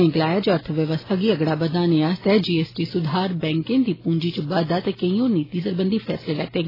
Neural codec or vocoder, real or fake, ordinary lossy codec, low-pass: none; real; AAC, 24 kbps; 5.4 kHz